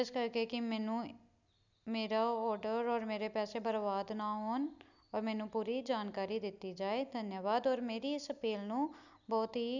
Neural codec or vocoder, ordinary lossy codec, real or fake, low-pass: none; none; real; 7.2 kHz